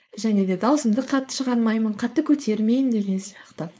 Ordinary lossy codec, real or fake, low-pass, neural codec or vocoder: none; fake; none; codec, 16 kHz, 4.8 kbps, FACodec